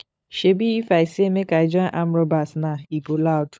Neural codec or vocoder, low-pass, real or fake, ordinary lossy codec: codec, 16 kHz, 16 kbps, FunCodec, trained on LibriTTS, 50 frames a second; none; fake; none